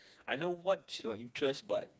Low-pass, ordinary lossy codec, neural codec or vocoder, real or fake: none; none; codec, 16 kHz, 2 kbps, FreqCodec, smaller model; fake